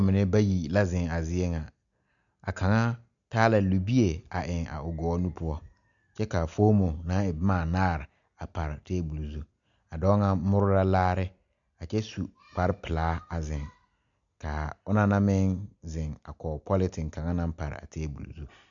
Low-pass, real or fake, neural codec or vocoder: 7.2 kHz; real; none